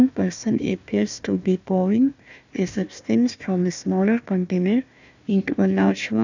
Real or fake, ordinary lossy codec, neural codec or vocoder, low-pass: fake; none; codec, 16 kHz, 1 kbps, FunCodec, trained on Chinese and English, 50 frames a second; 7.2 kHz